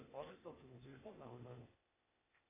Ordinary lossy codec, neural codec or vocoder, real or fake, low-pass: MP3, 24 kbps; codec, 16 kHz, 0.8 kbps, ZipCodec; fake; 3.6 kHz